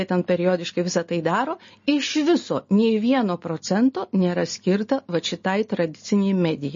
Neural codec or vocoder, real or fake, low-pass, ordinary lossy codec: none; real; 7.2 kHz; MP3, 32 kbps